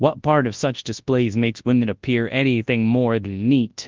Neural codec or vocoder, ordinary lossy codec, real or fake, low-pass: codec, 24 kHz, 0.9 kbps, WavTokenizer, large speech release; Opus, 32 kbps; fake; 7.2 kHz